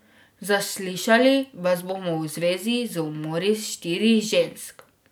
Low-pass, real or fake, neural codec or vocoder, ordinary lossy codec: none; real; none; none